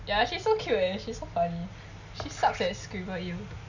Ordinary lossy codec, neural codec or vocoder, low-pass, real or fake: none; none; 7.2 kHz; real